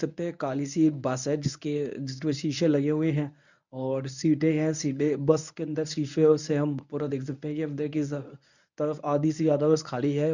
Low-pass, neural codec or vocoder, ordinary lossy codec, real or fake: 7.2 kHz; codec, 24 kHz, 0.9 kbps, WavTokenizer, medium speech release version 1; none; fake